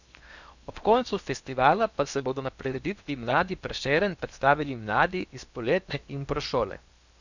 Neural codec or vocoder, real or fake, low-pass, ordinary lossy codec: codec, 16 kHz in and 24 kHz out, 0.8 kbps, FocalCodec, streaming, 65536 codes; fake; 7.2 kHz; none